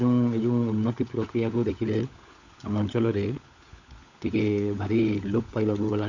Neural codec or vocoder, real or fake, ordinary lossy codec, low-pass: codec, 16 kHz, 16 kbps, FunCodec, trained on LibriTTS, 50 frames a second; fake; none; 7.2 kHz